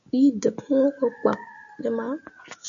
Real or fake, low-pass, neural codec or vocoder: real; 7.2 kHz; none